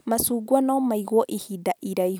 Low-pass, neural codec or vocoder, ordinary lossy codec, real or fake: none; none; none; real